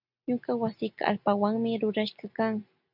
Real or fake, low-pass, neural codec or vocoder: real; 5.4 kHz; none